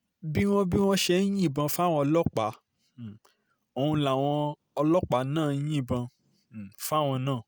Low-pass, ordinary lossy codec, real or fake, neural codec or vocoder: none; none; real; none